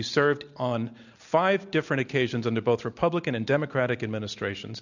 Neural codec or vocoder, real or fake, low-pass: none; real; 7.2 kHz